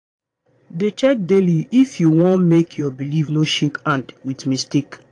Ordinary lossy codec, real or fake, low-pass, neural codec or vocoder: AAC, 48 kbps; fake; 9.9 kHz; vocoder, 22.05 kHz, 80 mel bands, Vocos